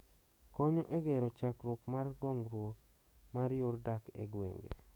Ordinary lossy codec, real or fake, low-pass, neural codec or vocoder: none; fake; none; codec, 44.1 kHz, 7.8 kbps, DAC